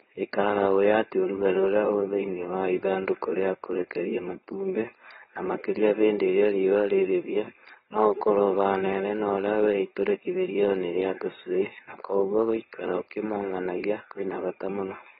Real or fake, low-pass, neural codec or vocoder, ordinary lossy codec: fake; 7.2 kHz; codec, 16 kHz, 4.8 kbps, FACodec; AAC, 16 kbps